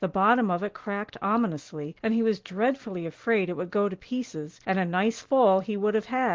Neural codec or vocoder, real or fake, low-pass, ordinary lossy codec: none; real; 7.2 kHz; Opus, 16 kbps